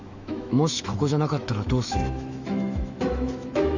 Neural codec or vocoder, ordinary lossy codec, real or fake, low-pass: autoencoder, 48 kHz, 128 numbers a frame, DAC-VAE, trained on Japanese speech; Opus, 64 kbps; fake; 7.2 kHz